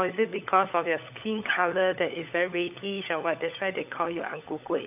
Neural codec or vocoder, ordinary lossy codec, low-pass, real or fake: codec, 16 kHz, 16 kbps, FunCodec, trained on LibriTTS, 50 frames a second; none; 3.6 kHz; fake